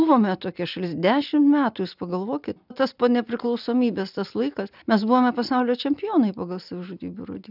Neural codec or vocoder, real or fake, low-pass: none; real; 5.4 kHz